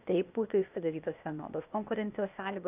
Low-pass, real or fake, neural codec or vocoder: 3.6 kHz; fake; codec, 16 kHz, 0.8 kbps, ZipCodec